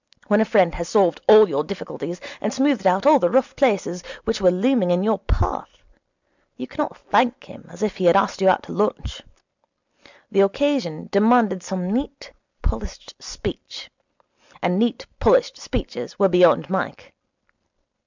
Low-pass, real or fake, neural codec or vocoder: 7.2 kHz; real; none